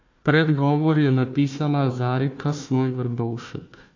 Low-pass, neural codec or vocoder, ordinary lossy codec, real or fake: 7.2 kHz; codec, 16 kHz, 1 kbps, FunCodec, trained on Chinese and English, 50 frames a second; AAC, 48 kbps; fake